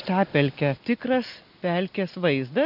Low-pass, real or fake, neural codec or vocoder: 5.4 kHz; real; none